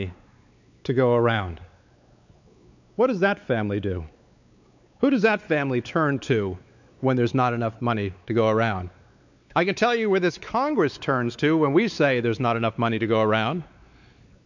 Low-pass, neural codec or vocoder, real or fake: 7.2 kHz; codec, 16 kHz, 4 kbps, X-Codec, WavLM features, trained on Multilingual LibriSpeech; fake